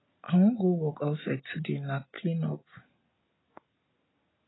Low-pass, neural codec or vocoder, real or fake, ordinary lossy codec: 7.2 kHz; none; real; AAC, 16 kbps